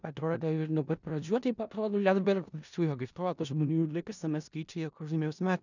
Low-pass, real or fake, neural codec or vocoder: 7.2 kHz; fake; codec, 16 kHz in and 24 kHz out, 0.4 kbps, LongCat-Audio-Codec, four codebook decoder